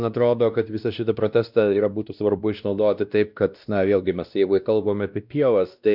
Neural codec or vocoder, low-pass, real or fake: codec, 16 kHz, 1 kbps, X-Codec, WavLM features, trained on Multilingual LibriSpeech; 5.4 kHz; fake